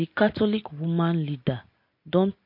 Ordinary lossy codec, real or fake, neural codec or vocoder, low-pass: MP3, 32 kbps; real; none; 5.4 kHz